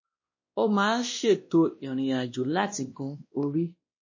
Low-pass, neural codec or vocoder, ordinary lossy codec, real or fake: 7.2 kHz; codec, 16 kHz, 1 kbps, X-Codec, WavLM features, trained on Multilingual LibriSpeech; MP3, 32 kbps; fake